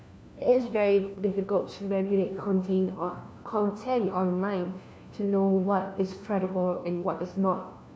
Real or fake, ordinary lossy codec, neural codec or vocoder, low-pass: fake; none; codec, 16 kHz, 1 kbps, FunCodec, trained on LibriTTS, 50 frames a second; none